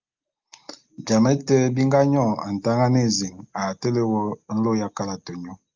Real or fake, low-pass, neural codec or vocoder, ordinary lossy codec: real; 7.2 kHz; none; Opus, 32 kbps